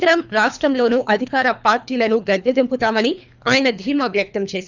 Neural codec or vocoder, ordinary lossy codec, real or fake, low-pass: codec, 24 kHz, 3 kbps, HILCodec; none; fake; 7.2 kHz